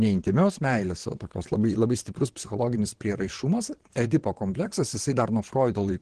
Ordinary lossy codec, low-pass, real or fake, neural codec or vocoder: Opus, 16 kbps; 9.9 kHz; fake; vocoder, 22.05 kHz, 80 mel bands, WaveNeXt